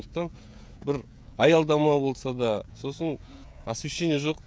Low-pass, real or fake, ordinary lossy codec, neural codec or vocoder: none; fake; none; codec, 16 kHz, 16 kbps, FreqCodec, smaller model